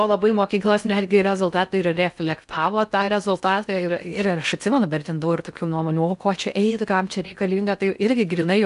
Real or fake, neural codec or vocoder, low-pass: fake; codec, 16 kHz in and 24 kHz out, 0.6 kbps, FocalCodec, streaming, 4096 codes; 10.8 kHz